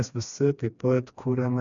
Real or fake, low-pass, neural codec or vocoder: fake; 7.2 kHz; codec, 16 kHz, 2 kbps, FreqCodec, smaller model